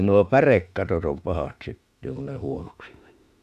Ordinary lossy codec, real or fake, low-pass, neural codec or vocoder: none; fake; 14.4 kHz; autoencoder, 48 kHz, 32 numbers a frame, DAC-VAE, trained on Japanese speech